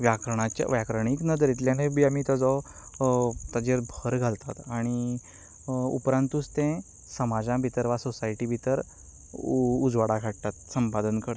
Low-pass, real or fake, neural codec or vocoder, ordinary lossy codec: none; real; none; none